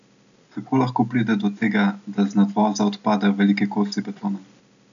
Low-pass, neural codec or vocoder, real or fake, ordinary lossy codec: 7.2 kHz; none; real; none